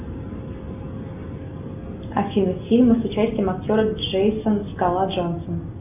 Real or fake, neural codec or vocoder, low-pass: real; none; 3.6 kHz